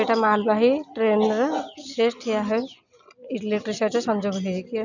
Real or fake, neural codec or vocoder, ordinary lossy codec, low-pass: real; none; none; 7.2 kHz